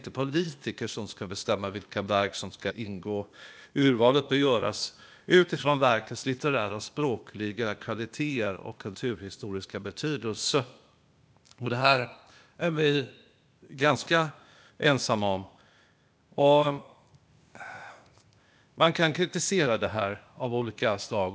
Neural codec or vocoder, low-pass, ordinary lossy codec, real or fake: codec, 16 kHz, 0.8 kbps, ZipCodec; none; none; fake